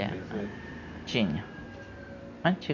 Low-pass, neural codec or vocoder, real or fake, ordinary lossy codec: 7.2 kHz; none; real; none